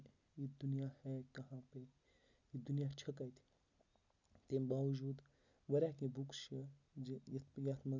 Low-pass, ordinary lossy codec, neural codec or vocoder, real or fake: 7.2 kHz; none; none; real